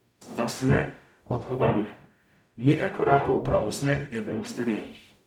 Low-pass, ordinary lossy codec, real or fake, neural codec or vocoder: 19.8 kHz; none; fake; codec, 44.1 kHz, 0.9 kbps, DAC